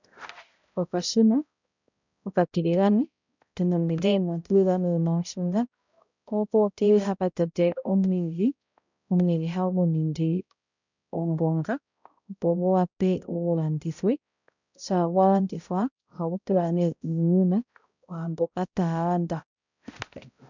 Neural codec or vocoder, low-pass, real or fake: codec, 16 kHz, 0.5 kbps, X-Codec, HuBERT features, trained on balanced general audio; 7.2 kHz; fake